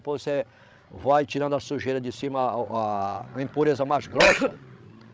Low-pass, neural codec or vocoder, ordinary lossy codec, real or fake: none; codec, 16 kHz, 16 kbps, FreqCodec, larger model; none; fake